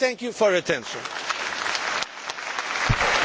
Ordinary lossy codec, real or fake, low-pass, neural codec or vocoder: none; real; none; none